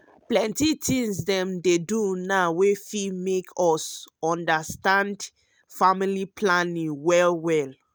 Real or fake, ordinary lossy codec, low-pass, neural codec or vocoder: real; none; none; none